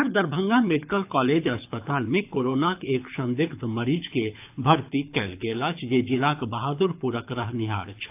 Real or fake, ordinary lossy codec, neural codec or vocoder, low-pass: fake; AAC, 32 kbps; codec, 24 kHz, 6 kbps, HILCodec; 3.6 kHz